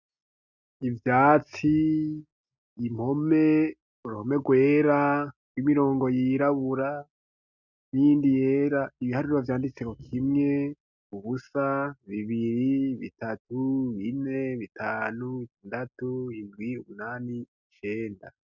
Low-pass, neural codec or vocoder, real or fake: 7.2 kHz; none; real